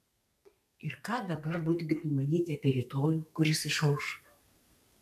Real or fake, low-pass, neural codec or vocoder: fake; 14.4 kHz; codec, 32 kHz, 1.9 kbps, SNAC